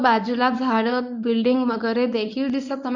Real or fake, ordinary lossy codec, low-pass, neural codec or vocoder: fake; MP3, 64 kbps; 7.2 kHz; codec, 24 kHz, 0.9 kbps, WavTokenizer, medium speech release version 1